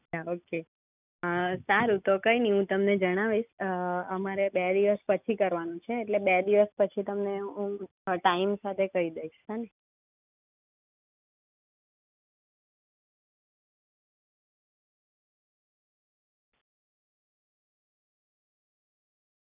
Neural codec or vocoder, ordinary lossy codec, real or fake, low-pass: none; none; real; 3.6 kHz